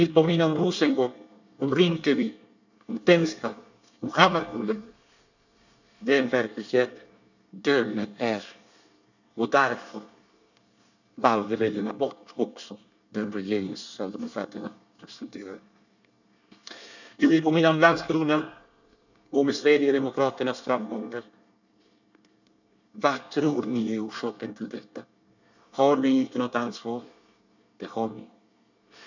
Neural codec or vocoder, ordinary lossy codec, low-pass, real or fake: codec, 24 kHz, 1 kbps, SNAC; none; 7.2 kHz; fake